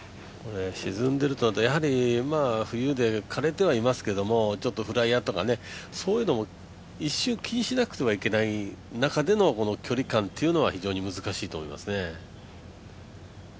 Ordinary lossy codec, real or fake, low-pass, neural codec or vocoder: none; real; none; none